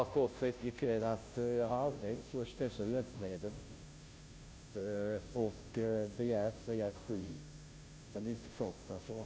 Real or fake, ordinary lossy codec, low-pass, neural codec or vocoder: fake; none; none; codec, 16 kHz, 0.5 kbps, FunCodec, trained on Chinese and English, 25 frames a second